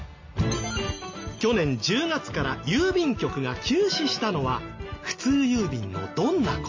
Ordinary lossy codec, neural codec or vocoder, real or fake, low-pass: MP3, 32 kbps; none; real; 7.2 kHz